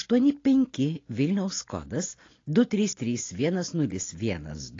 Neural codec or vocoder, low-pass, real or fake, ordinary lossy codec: none; 7.2 kHz; real; AAC, 32 kbps